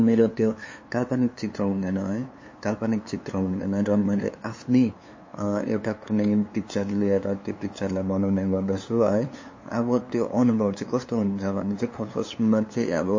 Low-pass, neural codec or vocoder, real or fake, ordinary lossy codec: 7.2 kHz; codec, 16 kHz, 2 kbps, FunCodec, trained on LibriTTS, 25 frames a second; fake; MP3, 32 kbps